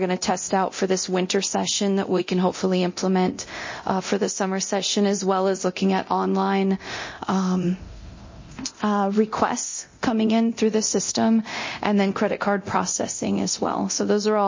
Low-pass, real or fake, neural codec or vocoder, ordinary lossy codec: 7.2 kHz; fake; codec, 24 kHz, 0.9 kbps, DualCodec; MP3, 32 kbps